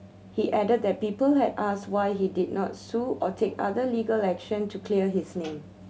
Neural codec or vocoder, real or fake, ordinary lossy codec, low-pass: none; real; none; none